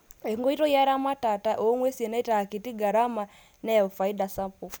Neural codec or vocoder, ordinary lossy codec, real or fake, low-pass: none; none; real; none